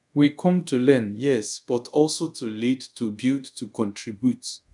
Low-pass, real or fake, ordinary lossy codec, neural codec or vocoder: 10.8 kHz; fake; none; codec, 24 kHz, 0.5 kbps, DualCodec